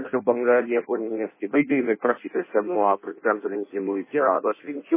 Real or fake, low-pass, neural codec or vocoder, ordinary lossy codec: fake; 3.6 kHz; codec, 16 kHz in and 24 kHz out, 0.6 kbps, FireRedTTS-2 codec; MP3, 16 kbps